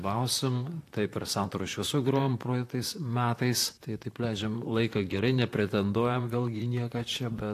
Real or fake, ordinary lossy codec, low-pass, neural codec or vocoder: fake; AAC, 64 kbps; 14.4 kHz; vocoder, 44.1 kHz, 128 mel bands, Pupu-Vocoder